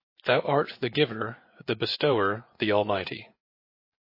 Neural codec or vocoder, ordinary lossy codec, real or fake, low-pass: vocoder, 24 kHz, 100 mel bands, Vocos; MP3, 24 kbps; fake; 5.4 kHz